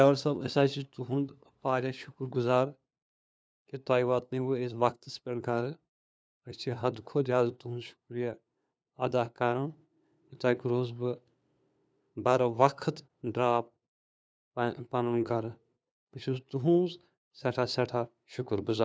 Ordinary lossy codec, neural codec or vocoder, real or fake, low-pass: none; codec, 16 kHz, 2 kbps, FunCodec, trained on LibriTTS, 25 frames a second; fake; none